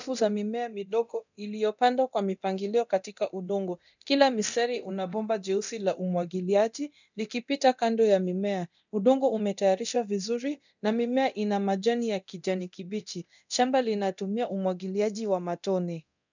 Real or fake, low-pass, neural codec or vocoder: fake; 7.2 kHz; codec, 24 kHz, 0.9 kbps, DualCodec